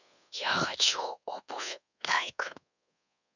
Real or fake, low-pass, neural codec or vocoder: fake; 7.2 kHz; codec, 24 kHz, 1.2 kbps, DualCodec